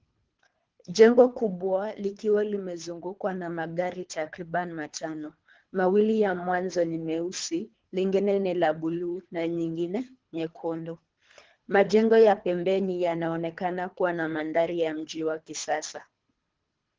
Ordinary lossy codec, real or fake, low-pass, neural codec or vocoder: Opus, 16 kbps; fake; 7.2 kHz; codec, 24 kHz, 3 kbps, HILCodec